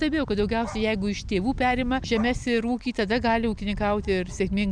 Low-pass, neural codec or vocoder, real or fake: 9.9 kHz; none; real